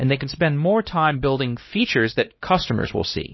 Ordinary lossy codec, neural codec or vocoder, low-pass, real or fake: MP3, 24 kbps; codec, 16 kHz, 1 kbps, X-Codec, HuBERT features, trained on LibriSpeech; 7.2 kHz; fake